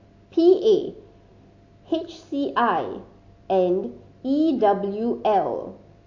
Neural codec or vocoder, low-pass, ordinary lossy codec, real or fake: none; 7.2 kHz; AAC, 48 kbps; real